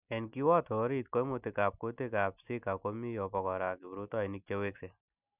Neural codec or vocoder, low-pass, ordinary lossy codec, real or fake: none; 3.6 kHz; none; real